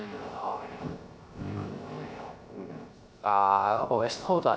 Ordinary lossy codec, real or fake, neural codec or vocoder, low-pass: none; fake; codec, 16 kHz, 0.3 kbps, FocalCodec; none